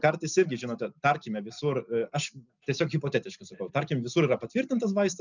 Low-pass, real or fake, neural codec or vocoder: 7.2 kHz; real; none